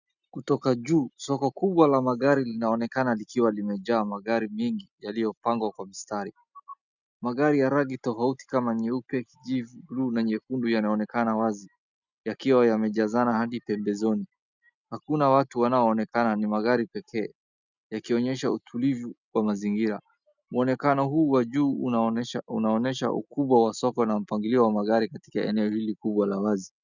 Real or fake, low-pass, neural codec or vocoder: real; 7.2 kHz; none